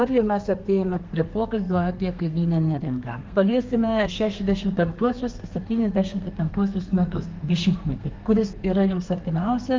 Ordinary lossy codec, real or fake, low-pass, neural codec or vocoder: Opus, 24 kbps; fake; 7.2 kHz; codec, 32 kHz, 1.9 kbps, SNAC